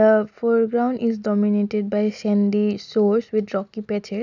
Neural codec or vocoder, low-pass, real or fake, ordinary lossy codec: none; 7.2 kHz; real; none